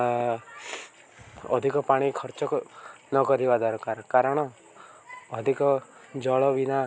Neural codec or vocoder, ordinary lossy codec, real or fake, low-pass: none; none; real; none